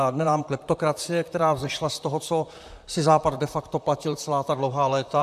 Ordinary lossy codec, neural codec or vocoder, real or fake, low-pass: AAC, 96 kbps; vocoder, 44.1 kHz, 128 mel bands, Pupu-Vocoder; fake; 14.4 kHz